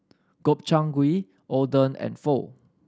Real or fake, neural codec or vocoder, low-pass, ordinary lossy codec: real; none; none; none